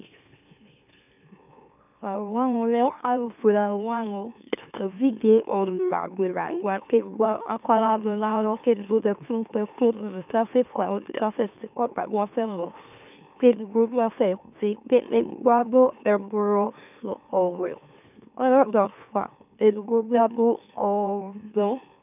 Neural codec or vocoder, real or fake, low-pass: autoencoder, 44.1 kHz, a latent of 192 numbers a frame, MeloTTS; fake; 3.6 kHz